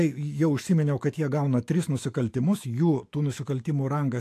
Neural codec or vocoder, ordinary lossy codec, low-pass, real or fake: autoencoder, 48 kHz, 128 numbers a frame, DAC-VAE, trained on Japanese speech; AAC, 64 kbps; 14.4 kHz; fake